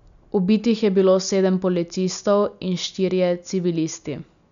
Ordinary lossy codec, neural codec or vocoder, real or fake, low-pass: none; none; real; 7.2 kHz